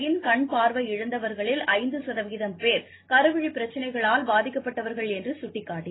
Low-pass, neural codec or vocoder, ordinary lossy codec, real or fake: 7.2 kHz; none; AAC, 16 kbps; real